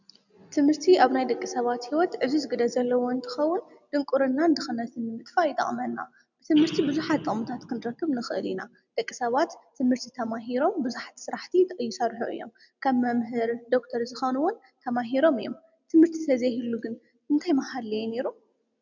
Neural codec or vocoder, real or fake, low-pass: none; real; 7.2 kHz